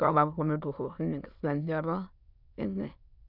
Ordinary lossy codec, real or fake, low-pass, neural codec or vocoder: none; fake; 5.4 kHz; autoencoder, 22.05 kHz, a latent of 192 numbers a frame, VITS, trained on many speakers